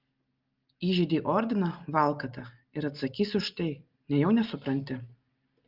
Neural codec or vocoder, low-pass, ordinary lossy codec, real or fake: none; 5.4 kHz; Opus, 32 kbps; real